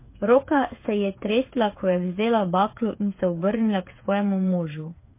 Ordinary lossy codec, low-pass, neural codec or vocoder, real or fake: MP3, 24 kbps; 3.6 kHz; codec, 16 kHz, 8 kbps, FreqCodec, smaller model; fake